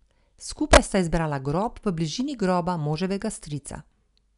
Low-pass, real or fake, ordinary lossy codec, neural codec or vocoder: 10.8 kHz; real; none; none